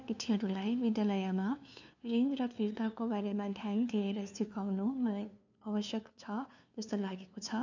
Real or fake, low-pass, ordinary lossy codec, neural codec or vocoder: fake; 7.2 kHz; none; codec, 16 kHz, 2 kbps, FunCodec, trained on LibriTTS, 25 frames a second